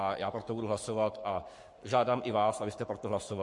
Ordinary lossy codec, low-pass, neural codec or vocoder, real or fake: MP3, 48 kbps; 10.8 kHz; codec, 44.1 kHz, 7.8 kbps, Pupu-Codec; fake